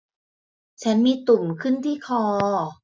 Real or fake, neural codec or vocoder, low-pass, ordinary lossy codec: real; none; none; none